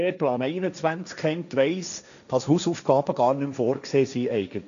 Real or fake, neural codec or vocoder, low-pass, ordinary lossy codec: fake; codec, 16 kHz, 1.1 kbps, Voila-Tokenizer; 7.2 kHz; none